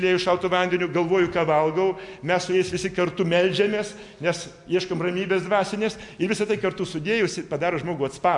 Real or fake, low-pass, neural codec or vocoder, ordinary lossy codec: real; 10.8 kHz; none; MP3, 64 kbps